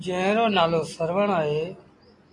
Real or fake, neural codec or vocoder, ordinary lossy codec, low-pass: real; none; AAC, 32 kbps; 10.8 kHz